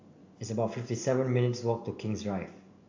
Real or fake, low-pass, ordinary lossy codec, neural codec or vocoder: real; 7.2 kHz; AAC, 48 kbps; none